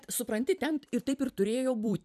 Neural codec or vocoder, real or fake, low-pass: vocoder, 44.1 kHz, 128 mel bands every 256 samples, BigVGAN v2; fake; 14.4 kHz